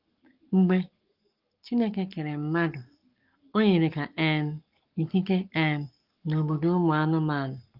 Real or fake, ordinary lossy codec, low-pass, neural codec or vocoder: fake; Opus, 32 kbps; 5.4 kHz; codec, 44.1 kHz, 7.8 kbps, Pupu-Codec